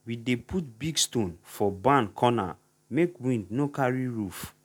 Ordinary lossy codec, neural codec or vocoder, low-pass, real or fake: none; none; 19.8 kHz; real